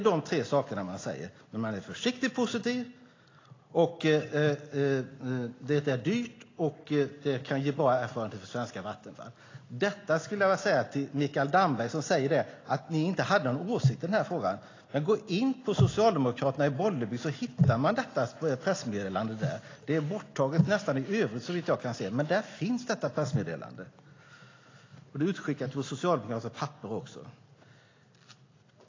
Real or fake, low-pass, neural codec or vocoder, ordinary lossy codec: real; 7.2 kHz; none; AAC, 32 kbps